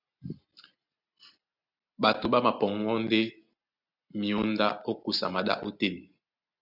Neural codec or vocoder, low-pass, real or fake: none; 5.4 kHz; real